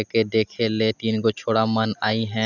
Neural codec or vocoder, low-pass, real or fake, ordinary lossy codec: none; 7.2 kHz; real; none